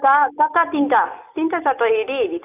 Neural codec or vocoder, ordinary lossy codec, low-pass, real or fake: none; none; 3.6 kHz; real